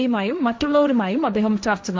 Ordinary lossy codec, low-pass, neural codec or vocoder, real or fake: none; none; codec, 16 kHz, 1.1 kbps, Voila-Tokenizer; fake